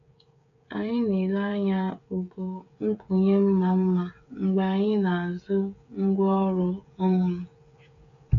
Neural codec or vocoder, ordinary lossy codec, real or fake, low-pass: codec, 16 kHz, 8 kbps, FreqCodec, smaller model; AAC, 48 kbps; fake; 7.2 kHz